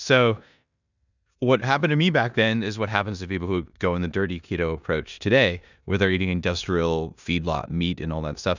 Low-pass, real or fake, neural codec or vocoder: 7.2 kHz; fake; codec, 16 kHz in and 24 kHz out, 0.9 kbps, LongCat-Audio-Codec, four codebook decoder